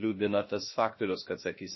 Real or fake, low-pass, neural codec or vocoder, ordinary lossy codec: fake; 7.2 kHz; codec, 16 kHz, 0.3 kbps, FocalCodec; MP3, 24 kbps